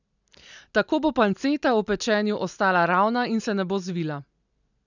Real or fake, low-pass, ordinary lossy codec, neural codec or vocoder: real; 7.2 kHz; none; none